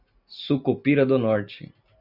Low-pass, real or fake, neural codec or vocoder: 5.4 kHz; real; none